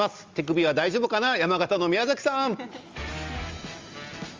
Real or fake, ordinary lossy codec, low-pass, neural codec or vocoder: real; Opus, 32 kbps; 7.2 kHz; none